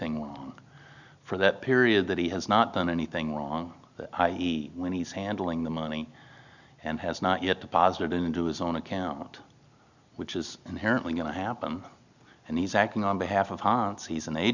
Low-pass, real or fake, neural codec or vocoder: 7.2 kHz; real; none